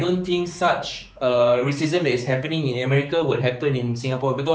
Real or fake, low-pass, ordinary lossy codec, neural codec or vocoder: fake; none; none; codec, 16 kHz, 4 kbps, X-Codec, HuBERT features, trained on general audio